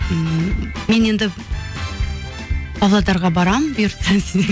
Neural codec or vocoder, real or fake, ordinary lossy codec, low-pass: none; real; none; none